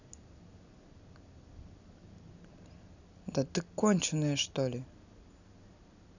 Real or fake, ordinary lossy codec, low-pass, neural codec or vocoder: real; none; 7.2 kHz; none